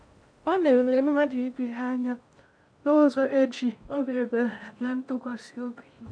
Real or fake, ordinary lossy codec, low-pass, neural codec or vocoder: fake; none; 9.9 kHz; codec, 16 kHz in and 24 kHz out, 0.6 kbps, FocalCodec, streaming, 2048 codes